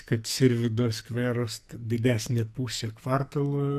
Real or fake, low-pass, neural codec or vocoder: fake; 14.4 kHz; codec, 44.1 kHz, 2.6 kbps, SNAC